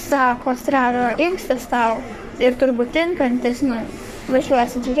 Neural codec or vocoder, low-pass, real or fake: codec, 44.1 kHz, 3.4 kbps, Pupu-Codec; 14.4 kHz; fake